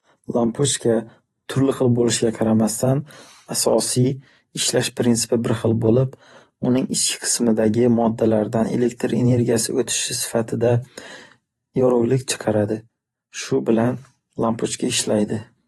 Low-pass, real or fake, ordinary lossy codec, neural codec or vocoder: 19.8 kHz; fake; AAC, 32 kbps; vocoder, 44.1 kHz, 128 mel bands every 512 samples, BigVGAN v2